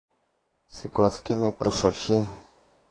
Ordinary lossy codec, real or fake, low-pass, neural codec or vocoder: AAC, 32 kbps; fake; 9.9 kHz; codec, 24 kHz, 1 kbps, SNAC